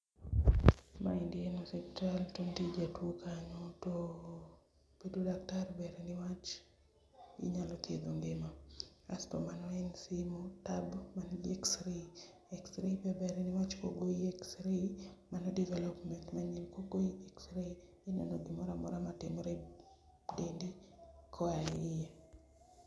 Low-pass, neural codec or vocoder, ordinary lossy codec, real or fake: none; none; none; real